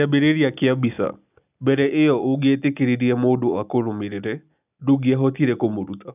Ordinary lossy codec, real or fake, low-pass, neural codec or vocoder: none; real; 3.6 kHz; none